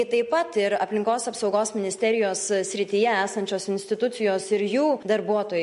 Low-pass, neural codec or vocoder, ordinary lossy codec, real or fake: 10.8 kHz; none; MP3, 48 kbps; real